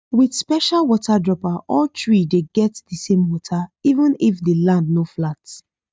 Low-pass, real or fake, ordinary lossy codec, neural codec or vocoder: none; real; none; none